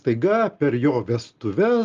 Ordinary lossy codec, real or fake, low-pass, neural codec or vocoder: Opus, 32 kbps; real; 7.2 kHz; none